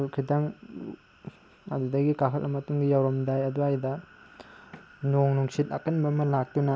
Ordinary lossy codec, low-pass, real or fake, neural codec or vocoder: none; none; real; none